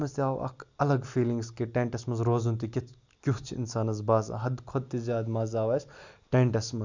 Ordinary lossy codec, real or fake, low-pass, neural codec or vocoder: Opus, 64 kbps; real; 7.2 kHz; none